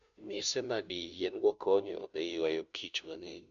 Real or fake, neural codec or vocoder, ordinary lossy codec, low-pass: fake; codec, 16 kHz, 0.5 kbps, FunCodec, trained on Chinese and English, 25 frames a second; MP3, 96 kbps; 7.2 kHz